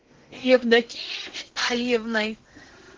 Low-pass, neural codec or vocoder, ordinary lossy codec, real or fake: 7.2 kHz; codec, 16 kHz in and 24 kHz out, 0.8 kbps, FocalCodec, streaming, 65536 codes; Opus, 16 kbps; fake